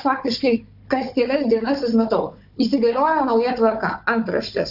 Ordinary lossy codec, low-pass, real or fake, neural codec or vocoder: AAC, 48 kbps; 5.4 kHz; fake; codec, 16 kHz, 4 kbps, FunCodec, trained on Chinese and English, 50 frames a second